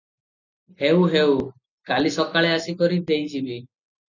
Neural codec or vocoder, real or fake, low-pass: none; real; 7.2 kHz